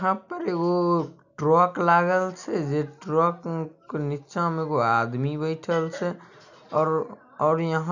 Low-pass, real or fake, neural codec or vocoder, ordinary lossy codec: 7.2 kHz; real; none; none